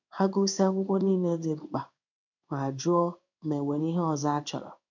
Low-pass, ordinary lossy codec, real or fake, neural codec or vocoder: 7.2 kHz; none; fake; codec, 16 kHz in and 24 kHz out, 1 kbps, XY-Tokenizer